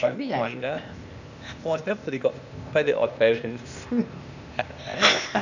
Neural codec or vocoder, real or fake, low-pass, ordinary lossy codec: codec, 16 kHz, 0.8 kbps, ZipCodec; fake; 7.2 kHz; none